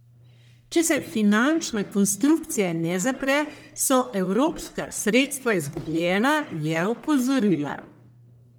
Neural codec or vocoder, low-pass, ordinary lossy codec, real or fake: codec, 44.1 kHz, 1.7 kbps, Pupu-Codec; none; none; fake